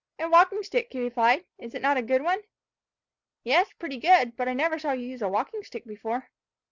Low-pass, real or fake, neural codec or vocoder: 7.2 kHz; real; none